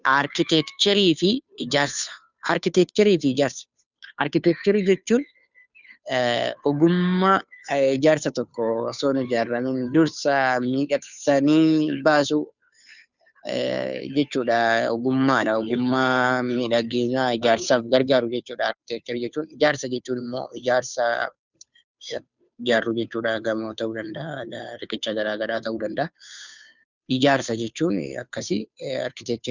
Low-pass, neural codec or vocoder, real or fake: 7.2 kHz; codec, 16 kHz, 2 kbps, FunCodec, trained on Chinese and English, 25 frames a second; fake